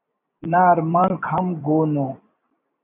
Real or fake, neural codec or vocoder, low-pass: fake; vocoder, 44.1 kHz, 128 mel bands every 256 samples, BigVGAN v2; 3.6 kHz